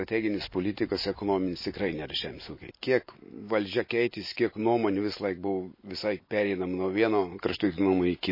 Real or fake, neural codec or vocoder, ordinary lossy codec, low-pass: real; none; MP3, 24 kbps; 5.4 kHz